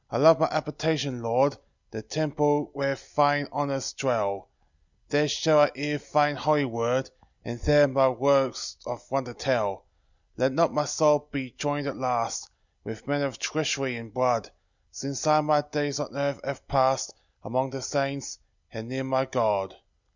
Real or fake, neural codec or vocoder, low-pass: real; none; 7.2 kHz